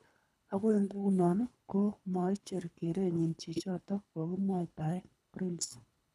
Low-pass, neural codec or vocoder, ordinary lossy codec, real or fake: none; codec, 24 kHz, 3 kbps, HILCodec; none; fake